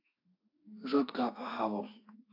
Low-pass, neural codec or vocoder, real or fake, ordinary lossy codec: 5.4 kHz; autoencoder, 48 kHz, 32 numbers a frame, DAC-VAE, trained on Japanese speech; fake; AAC, 32 kbps